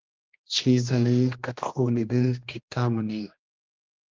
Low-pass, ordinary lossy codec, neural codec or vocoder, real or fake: 7.2 kHz; Opus, 24 kbps; codec, 16 kHz, 1 kbps, X-Codec, HuBERT features, trained on general audio; fake